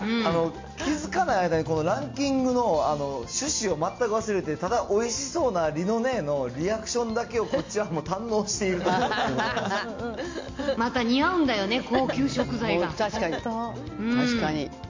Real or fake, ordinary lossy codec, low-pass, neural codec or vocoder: real; MP3, 48 kbps; 7.2 kHz; none